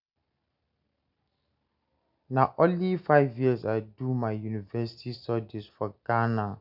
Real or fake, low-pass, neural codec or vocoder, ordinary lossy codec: real; 5.4 kHz; none; none